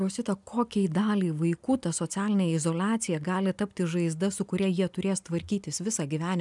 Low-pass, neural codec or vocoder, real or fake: 10.8 kHz; none; real